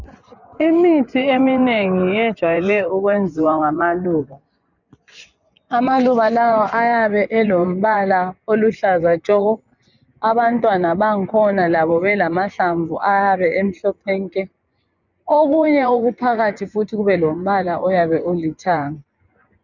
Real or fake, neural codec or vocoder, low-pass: fake; vocoder, 24 kHz, 100 mel bands, Vocos; 7.2 kHz